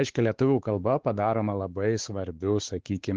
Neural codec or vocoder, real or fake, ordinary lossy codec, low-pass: codec, 16 kHz, 4 kbps, X-Codec, WavLM features, trained on Multilingual LibriSpeech; fake; Opus, 16 kbps; 7.2 kHz